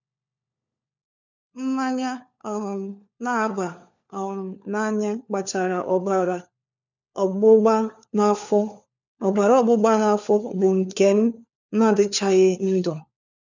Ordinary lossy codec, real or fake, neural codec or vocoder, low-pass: none; fake; codec, 16 kHz, 4 kbps, FunCodec, trained on LibriTTS, 50 frames a second; 7.2 kHz